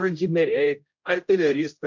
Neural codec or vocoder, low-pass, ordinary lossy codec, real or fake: codec, 16 kHz, 0.5 kbps, X-Codec, HuBERT features, trained on general audio; 7.2 kHz; MP3, 48 kbps; fake